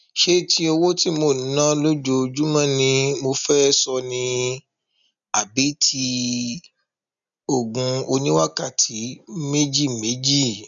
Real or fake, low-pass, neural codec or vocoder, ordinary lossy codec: real; 7.2 kHz; none; none